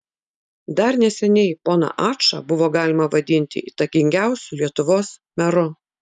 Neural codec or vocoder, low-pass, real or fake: none; 10.8 kHz; real